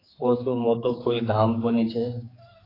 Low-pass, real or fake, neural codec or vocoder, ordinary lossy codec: 5.4 kHz; fake; codec, 16 kHz, 4 kbps, X-Codec, HuBERT features, trained on general audio; AAC, 24 kbps